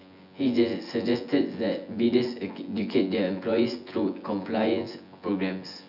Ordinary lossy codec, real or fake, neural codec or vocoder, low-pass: none; fake; vocoder, 24 kHz, 100 mel bands, Vocos; 5.4 kHz